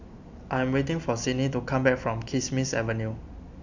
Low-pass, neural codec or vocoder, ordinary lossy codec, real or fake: 7.2 kHz; none; none; real